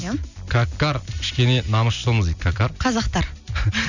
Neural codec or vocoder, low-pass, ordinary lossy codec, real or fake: none; 7.2 kHz; none; real